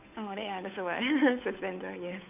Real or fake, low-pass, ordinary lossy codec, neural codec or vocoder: fake; 3.6 kHz; none; codec, 16 kHz, 8 kbps, FunCodec, trained on Chinese and English, 25 frames a second